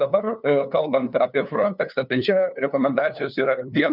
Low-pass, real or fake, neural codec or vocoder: 5.4 kHz; fake; codec, 16 kHz, 2 kbps, FunCodec, trained on LibriTTS, 25 frames a second